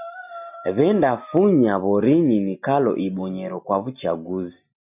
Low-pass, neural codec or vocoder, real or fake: 3.6 kHz; none; real